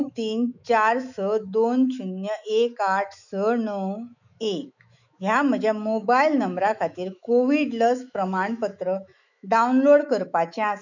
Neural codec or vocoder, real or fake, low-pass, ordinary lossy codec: autoencoder, 48 kHz, 128 numbers a frame, DAC-VAE, trained on Japanese speech; fake; 7.2 kHz; none